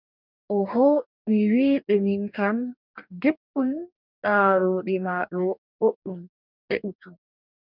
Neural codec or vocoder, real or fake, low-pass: codec, 44.1 kHz, 2.6 kbps, DAC; fake; 5.4 kHz